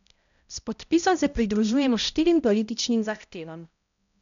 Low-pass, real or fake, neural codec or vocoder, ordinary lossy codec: 7.2 kHz; fake; codec, 16 kHz, 0.5 kbps, X-Codec, HuBERT features, trained on balanced general audio; MP3, 96 kbps